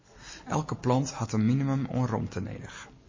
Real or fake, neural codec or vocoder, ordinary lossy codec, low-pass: real; none; MP3, 32 kbps; 7.2 kHz